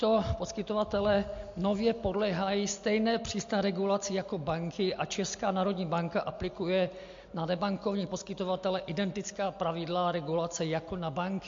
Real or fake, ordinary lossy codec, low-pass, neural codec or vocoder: real; MP3, 48 kbps; 7.2 kHz; none